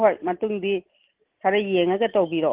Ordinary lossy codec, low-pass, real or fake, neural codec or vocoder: Opus, 16 kbps; 3.6 kHz; real; none